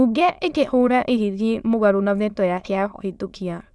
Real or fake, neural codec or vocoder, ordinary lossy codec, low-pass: fake; autoencoder, 22.05 kHz, a latent of 192 numbers a frame, VITS, trained on many speakers; none; none